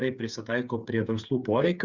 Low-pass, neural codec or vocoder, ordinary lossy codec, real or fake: 7.2 kHz; codec, 16 kHz, 8 kbps, FreqCodec, smaller model; Opus, 64 kbps; fake